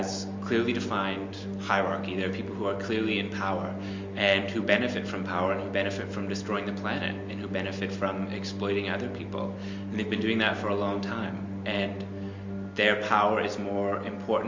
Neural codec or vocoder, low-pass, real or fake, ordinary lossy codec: none; 7.2 kHz; real; MP3, 48 kbps